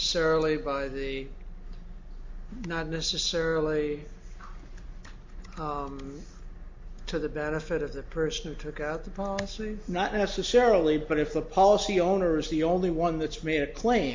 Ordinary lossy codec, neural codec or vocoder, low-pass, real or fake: MP3, 48 kbps; none; 7.2 kHz; real